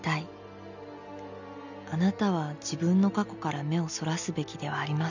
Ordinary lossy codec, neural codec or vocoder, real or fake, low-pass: none; none; real; 7.2 kHz